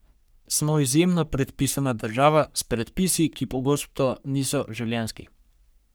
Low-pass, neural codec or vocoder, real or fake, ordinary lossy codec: none; codec, 44.1 kHz, 3.4 kbps, Pupu-Codec; fake; none